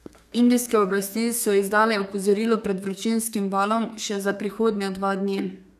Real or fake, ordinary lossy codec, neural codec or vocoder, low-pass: fake; none; codec, 32 kHz, 1.9 kbps, SNAC; 14.4 kHz